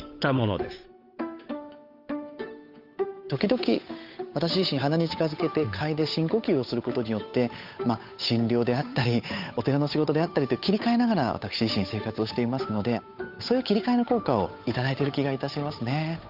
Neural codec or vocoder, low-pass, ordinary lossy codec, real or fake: codec, 16 kHz, 8 kbps, FunCodec, trained on Chinese and English, 25 frames a second; 5.4 kHz; none; fake